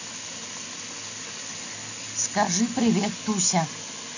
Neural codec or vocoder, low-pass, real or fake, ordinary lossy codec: none; 7.2 kHz; real; none